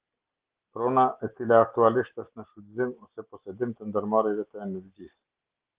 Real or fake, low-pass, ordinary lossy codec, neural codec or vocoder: real; 3.6 kHz; Opus, 16 kbps; none